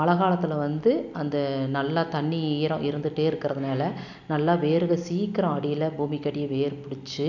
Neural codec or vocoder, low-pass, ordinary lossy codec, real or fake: none; 7.2 kHz; none; real